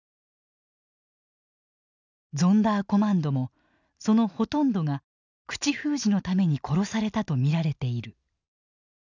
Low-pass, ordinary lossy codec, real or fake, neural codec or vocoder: 7.2 kHz; none; real; none